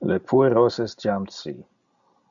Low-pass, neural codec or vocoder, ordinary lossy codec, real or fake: 7.2 kHz; none; MP3, 96 kbps; real